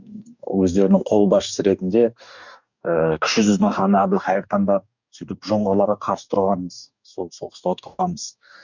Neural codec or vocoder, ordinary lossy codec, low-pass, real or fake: codec, 44.1 kHz, 2.6 kbps, DAC; none; 7.2 kHz; fake